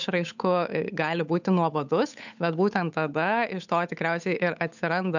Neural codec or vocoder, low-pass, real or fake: codec, 16 kHz, 8 kbps, FunCodec, trained on Chinese and English, 25 frames a second; 7.2 kHz; fake